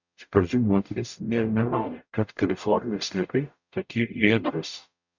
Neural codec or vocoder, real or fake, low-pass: codec, 44.1 kHz, 0.9 kbps, DAC; fake; 7.2 kHz